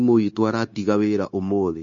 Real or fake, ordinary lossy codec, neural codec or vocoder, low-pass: fake; MP3, 32 kbps; codec, 16 kHz, 0.9 kbps, LongCat-Audio-Codec; 7.2 kHz